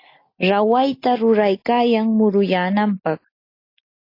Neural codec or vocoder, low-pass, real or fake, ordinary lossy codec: none; 5.4 kHz; real; AAC, 32 kbps